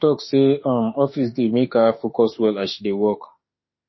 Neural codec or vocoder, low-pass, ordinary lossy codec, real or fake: autoencoder, 48 kHz, 32 numbers a frame, DAC-VAE, trained on Japanese speech; 7.2 kHz; MP3, 24 kbps; fake